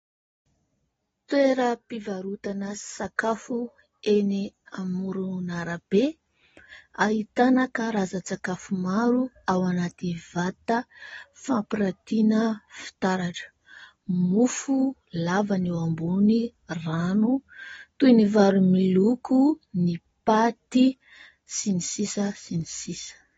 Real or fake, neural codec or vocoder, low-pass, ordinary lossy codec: real; none; 19.8 kHz; AAC, 24 kbps